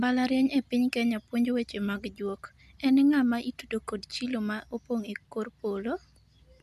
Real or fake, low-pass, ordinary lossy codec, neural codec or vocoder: real; 14.4 kHz; none; none